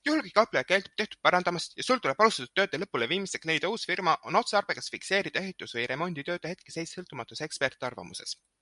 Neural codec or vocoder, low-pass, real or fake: none; 10.8 kHz; real